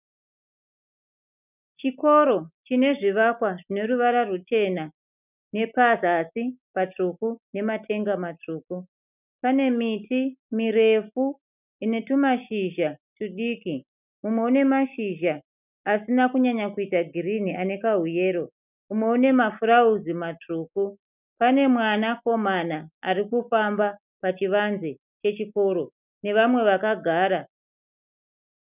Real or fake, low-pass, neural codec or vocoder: real; 3.6 kHz; none